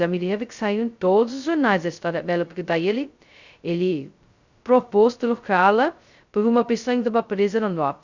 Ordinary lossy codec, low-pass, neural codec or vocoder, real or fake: Opus, 64 kbps; 7.2 kHz; codec, 16 kHz, 0.2 kbps, FocalCodec; fake